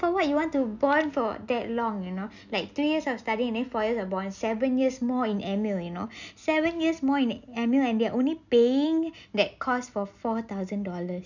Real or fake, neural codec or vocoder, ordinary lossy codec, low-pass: real; none; none; 7.2 kHz